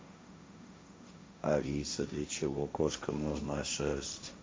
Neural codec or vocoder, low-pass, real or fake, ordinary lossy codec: codec, 16 kHz, 1.1 kbps, Voila-Tokenizer; none; fake; none